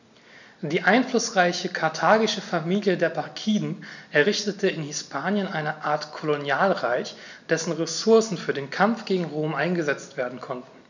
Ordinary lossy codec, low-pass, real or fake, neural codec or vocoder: none; 7.2 kHz; real; none